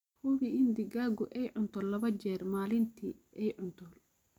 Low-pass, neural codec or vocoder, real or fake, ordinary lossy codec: 19.8 kHz; none; real; none